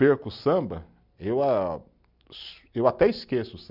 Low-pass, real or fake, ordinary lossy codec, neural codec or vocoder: 5.4 kHz; real; none; none